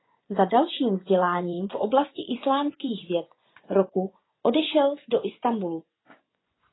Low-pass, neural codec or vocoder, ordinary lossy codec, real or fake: 7.2 kHz; vocoder, 24 kHz, 100 mel bands, Vocos; AAC, 16 kbps; fake